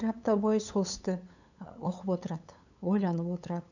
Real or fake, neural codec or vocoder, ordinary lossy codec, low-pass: fake; codec, 16 kHz, 8 kbps, FunCodec, trained on LibriTTS, 25 frames a second; none; 7.2 kHz